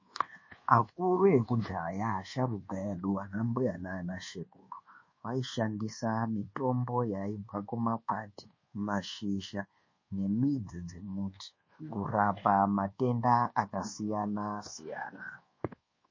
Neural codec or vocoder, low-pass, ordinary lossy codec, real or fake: codec, 24 kHz, 1.2 kbps, DualCodec; 7.2 kHz; MP3, 32 kbps; fake